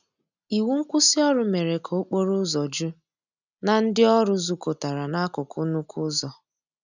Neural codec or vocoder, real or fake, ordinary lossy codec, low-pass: none; real; none; 7.2 kHz